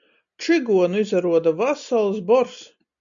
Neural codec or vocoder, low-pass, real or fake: none; 7.2 kHz; real